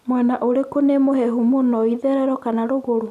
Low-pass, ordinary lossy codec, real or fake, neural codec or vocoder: 14.4 kHz; none; real; none